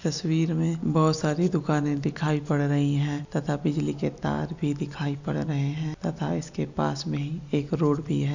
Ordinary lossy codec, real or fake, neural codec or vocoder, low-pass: none; real; none; 7.2 kHz